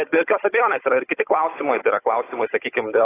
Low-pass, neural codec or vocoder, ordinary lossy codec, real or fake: 3.6 kHz; codec, 16 kHz, 16 kbps, FunCodec, trained on Chinese and English, 50 frames a second; AAC, 16 kbps; fake